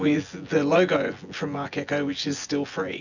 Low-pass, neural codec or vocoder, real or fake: 7.2 kHz; vocoder, 24 kHz, 100 mel bands, Vocos; fake